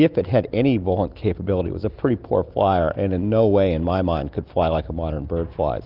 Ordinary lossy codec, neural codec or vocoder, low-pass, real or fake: Opus, 24 kbps; none; 5.4 kHz; real